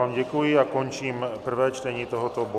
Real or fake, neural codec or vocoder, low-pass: real; none; 14.4 kHz